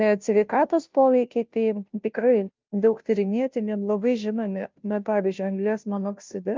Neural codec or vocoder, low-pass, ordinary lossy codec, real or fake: codec, 16 kHz, 0.5 kbps, FunCodec, trained on Chinese and English, 25 frames a second; 7.2 kHz; Opus, 24 kbps; fake